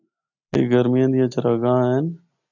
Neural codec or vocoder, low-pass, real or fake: none; 7.2 kHz; real